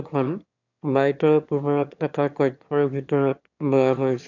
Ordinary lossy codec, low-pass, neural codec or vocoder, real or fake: none; 7.2 kHz; autoencoder, 22.05 kHz, a latent of 192 numbers a frame, VITS, trained on one speaker; fake